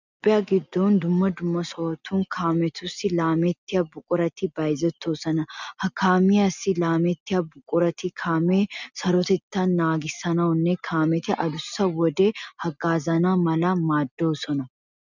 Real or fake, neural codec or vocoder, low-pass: real; none; 7.2 kHz